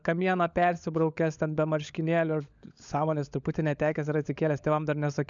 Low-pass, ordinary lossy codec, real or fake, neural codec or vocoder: 7.2 kHz; MP3, 96 kbps; fake; codec, 16 kHz, 16 kbps, FunCodec, trained on LibriTTS, 50 frames a second